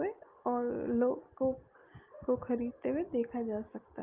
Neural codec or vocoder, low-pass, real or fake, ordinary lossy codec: none; 3.6 kHz; real; Opus, 32 kbps